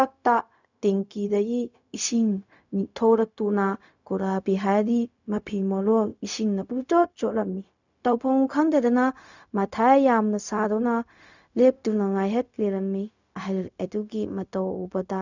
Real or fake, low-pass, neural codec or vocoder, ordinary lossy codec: fake; 7.2 kHz; codec, 16 kHz, 0.4 kbps, LongCat-Audio-Codec; none